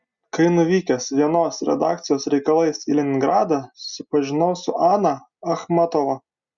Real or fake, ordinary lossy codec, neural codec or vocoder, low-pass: real; Opus, 64 kbps; none; 7.2 kHz